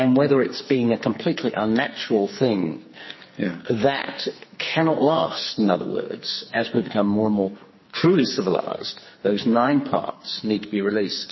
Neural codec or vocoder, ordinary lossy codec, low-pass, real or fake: codec, 44.1 kHz, 2.6 kbps, SNAC; MP3, 24 kbps; 7.2 kHz; fake